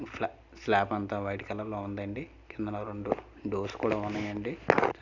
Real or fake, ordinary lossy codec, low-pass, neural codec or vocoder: real; none; 7.2 kHz; none